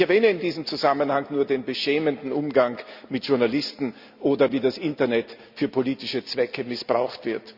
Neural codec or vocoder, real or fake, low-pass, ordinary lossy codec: none; real; 5.4 kHz; Opus, 64 kbps